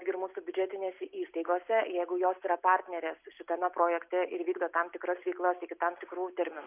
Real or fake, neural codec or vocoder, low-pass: real; none; 3.6 kHz